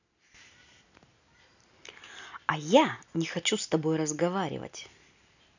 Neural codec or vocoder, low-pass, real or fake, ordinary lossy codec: none; 7.2 kHz; real; none